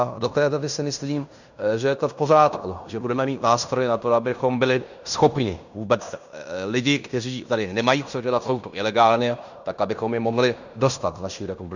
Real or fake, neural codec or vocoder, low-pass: fake; codec, 16 kHz in and 24 kHz out, 0.9 kbps, LongCat-Audio-Codec, fine tuned four codebook decoder; 7.2 kHz